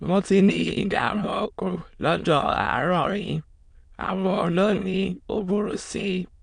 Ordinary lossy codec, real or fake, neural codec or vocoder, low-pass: none; fake; autoencoder, 22.05 kHz, a latent of 192 numbers a frame, VITS, trained on many speakers; 9.9 kHz